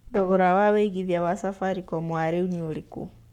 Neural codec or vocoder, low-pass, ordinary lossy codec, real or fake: codec, 44.1 kHz, 7.8 kbps, Pupu-Codec; 19.8 kHz; none; fake